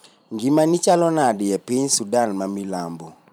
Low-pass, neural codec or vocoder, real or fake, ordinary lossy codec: none; vocoder, 44.1 kHz, 128 mel bands every 512 samples, BigVGAN v2; fake; none